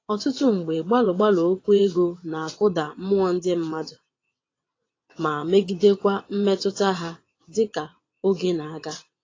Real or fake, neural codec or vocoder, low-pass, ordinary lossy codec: fake; vocoder, 22.05 kHz, 80 mel bands, WaveNeXt; 7.2 kHz; AAC, 32 kbps